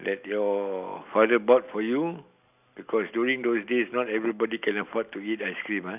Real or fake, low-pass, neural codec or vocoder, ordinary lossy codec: real; 3.6 kHz; none; none